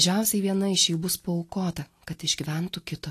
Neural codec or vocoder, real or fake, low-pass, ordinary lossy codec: none; real; 14.4 kHz; AAC, 48 kbps